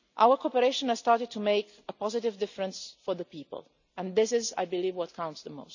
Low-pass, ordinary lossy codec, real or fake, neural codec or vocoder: 7.2 kHz; none; real; none